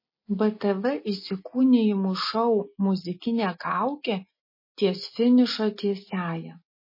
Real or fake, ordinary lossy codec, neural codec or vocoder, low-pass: real; MP3, 24 kbps; none; 5.4 kHz